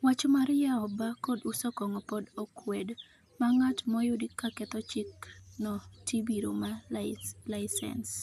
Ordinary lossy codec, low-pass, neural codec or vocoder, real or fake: none; 14.4 kHz; none; real